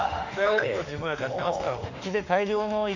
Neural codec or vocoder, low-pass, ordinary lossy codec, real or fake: autoencoder, 48 kHz, 32 numbers a frame, DAC-VAE, trained on Japanese speech; 7.2 kHz; none; fake